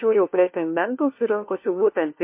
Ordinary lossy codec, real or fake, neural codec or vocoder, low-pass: MP3, 24 kbps; fake; codec, 16 kHz, 1 kbps, FunCodec, trained on LibriTTS, 50 frames a second; 3.6 kHz